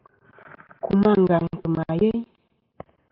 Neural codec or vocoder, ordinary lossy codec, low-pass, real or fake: none; Opus, 32 kbps; 5.4 kHz; real